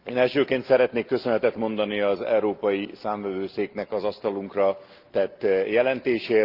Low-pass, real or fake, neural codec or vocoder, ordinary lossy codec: 5.4 kHz; real; none; Opus, 32 kbps